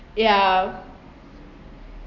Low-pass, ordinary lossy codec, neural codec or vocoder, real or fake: 7.2 kHz; none; none; real